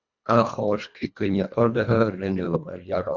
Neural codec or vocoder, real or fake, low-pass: codec, 24 kHz, 1.5 kbps, HILCodec; fake; 7.2 kHz